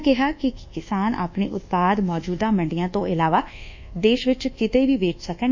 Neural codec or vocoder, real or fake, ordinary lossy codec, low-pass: codec, 24 kHz, 1.2 kbps, DualCodec; fake; MP3, 64 kbps; 7.2 kHz